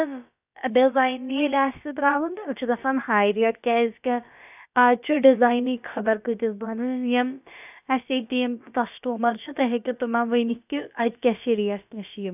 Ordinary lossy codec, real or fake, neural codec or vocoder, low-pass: none; fake; codec, 16 kHz, about 1 kbps, DyCAST, with the encoder's durations; 3.6 kHz